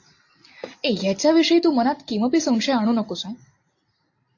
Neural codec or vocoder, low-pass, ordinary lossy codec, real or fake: none; 7.2 kHz; AAC, 48 kbps; real